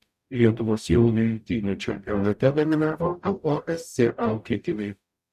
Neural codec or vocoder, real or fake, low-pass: codec, 44.1 kHz, 0.9 kbps, DAC; fake; 14.4 kHz